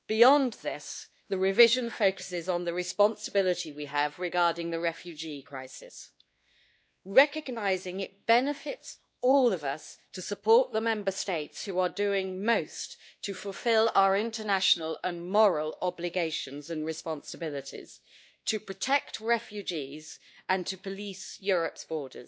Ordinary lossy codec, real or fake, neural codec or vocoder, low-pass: none; fake; codec, 16 kHz, 2 kbps, X-Codec, WavLM features, trained on Multilingual LibriSpeech; none